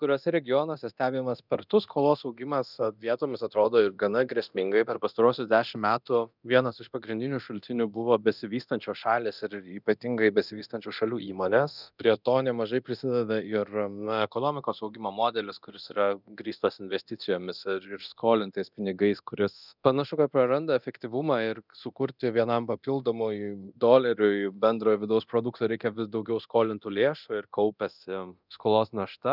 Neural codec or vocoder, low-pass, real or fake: codec, 24 kHz, 0.9 kbps, DualCodec; 5.4 kHz; fake